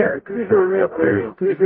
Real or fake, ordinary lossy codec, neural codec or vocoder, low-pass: fake; MP3, 24 kbps; codec, 44.1 kHz, 0.9 kbps, DAC; 7.2 kHz